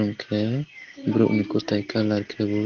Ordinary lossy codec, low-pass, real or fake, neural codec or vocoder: Opus, 16 kbps; 7.2 kHz; real; none